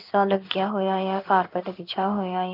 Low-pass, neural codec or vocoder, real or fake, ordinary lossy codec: 5.4 kHz; codec, 16 kHz in and 24 kHz out, 1 kbps, XY-Tokenizer; fake; AAC, 32 kbps